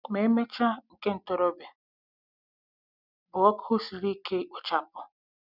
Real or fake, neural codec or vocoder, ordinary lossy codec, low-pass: real; none; none; 5.4 kHz